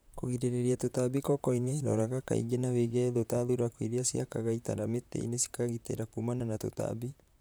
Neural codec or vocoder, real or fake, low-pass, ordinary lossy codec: vocoder, 44.1 kHz, 128 mel bands, Pupu-Vocoder; fake; none; none